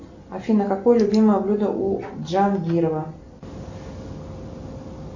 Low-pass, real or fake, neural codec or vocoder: 7.2 kHz; real; none